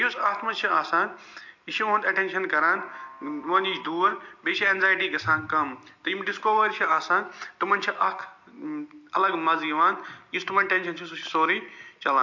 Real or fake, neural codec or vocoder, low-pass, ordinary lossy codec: real; none; 7.2 kHz; MP3, 48 kbps